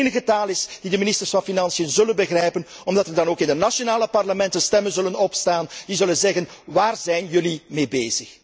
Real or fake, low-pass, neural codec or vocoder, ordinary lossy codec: real; none; none; none